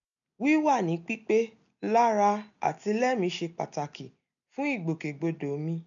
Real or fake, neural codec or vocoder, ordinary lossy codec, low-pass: real; none; none; 7.2 kHz